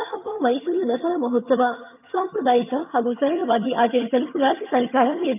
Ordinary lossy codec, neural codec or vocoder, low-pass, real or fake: none; vocoder, 22.05 kHz, 80 mel bands, HiFi-GAN; 3.6 kHz; fake